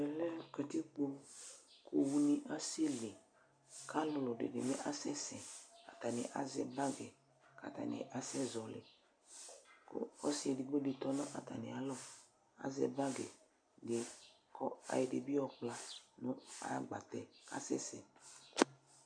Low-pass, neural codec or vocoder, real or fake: 9.9 kHz; none; real